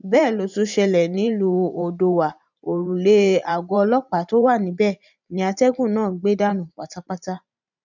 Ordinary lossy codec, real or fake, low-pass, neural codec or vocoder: none; fake; 7.2 kHz; vocoder, 44.1 kHz, 128 mel bands every 512 samples, BigVGAN v2